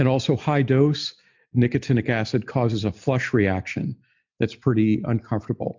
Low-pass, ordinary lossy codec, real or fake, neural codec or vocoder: 7.2 kHz; MP3, 64 kbps; real; none